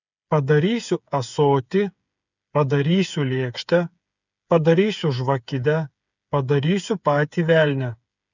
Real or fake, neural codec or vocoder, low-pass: fake; codec, 16 kHz, 8 kbps, FreqCodec, smaller model; 7.2 kHz